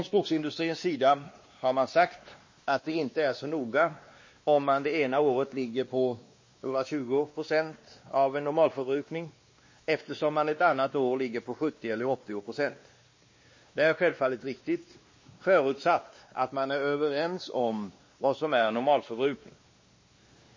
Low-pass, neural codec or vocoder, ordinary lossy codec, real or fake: 7.2 kHz; codec, 16 kHz, 2 kbps, X-Codec, WavLM features, trained on Multilingual LibriSpeech; MP3, 32 kbps; fake